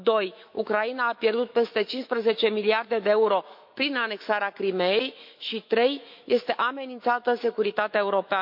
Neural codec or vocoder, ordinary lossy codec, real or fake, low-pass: autoencoder, 48 kHz, 128 numbers a frame, DAC-VAE, trained on Japanese speech; MP3, 48 kbps; fake; 5.4 kHz